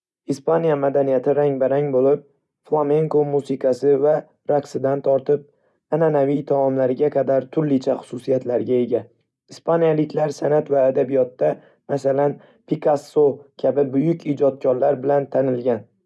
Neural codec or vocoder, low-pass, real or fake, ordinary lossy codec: none; none; real; none